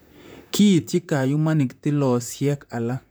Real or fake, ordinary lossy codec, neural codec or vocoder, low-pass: fake; none; vocoder, 44.1 kHz, 128 mel bands, Pupu-Vocoder; none